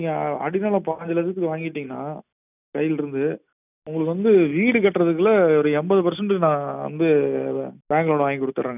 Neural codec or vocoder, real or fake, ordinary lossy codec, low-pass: none; real; none; 3.6 kHz